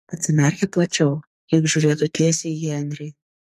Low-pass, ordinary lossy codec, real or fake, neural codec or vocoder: 14.4 kHz; MP3, 64 kbps; fake; codec, 44.1 kHz, 2.6 kbps, SNAC